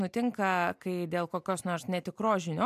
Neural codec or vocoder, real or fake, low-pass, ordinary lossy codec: none; real; 14.4 kHz; MP3, 96 kbps